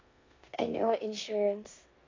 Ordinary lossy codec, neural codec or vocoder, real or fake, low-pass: AAC, 32 kbps; codec, 16 kHz in and 24 kHz out, 0.9 kbps, LongCat-Audio-Codec, four codebook decoder; fake; 7.2 kHz